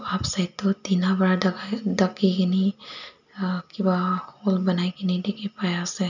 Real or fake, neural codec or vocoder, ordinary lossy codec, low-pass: real; none; none; 7.2 kHz